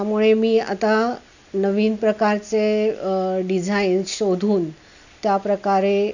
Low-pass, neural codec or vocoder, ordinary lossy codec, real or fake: 7.2 kHz; none; none; real